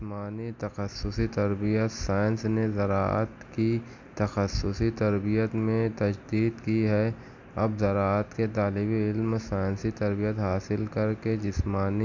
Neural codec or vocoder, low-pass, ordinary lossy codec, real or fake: none; 7.2 kHz; none; real